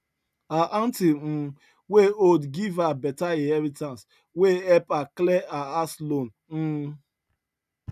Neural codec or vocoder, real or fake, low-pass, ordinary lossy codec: none; real; 14.4 kHz; none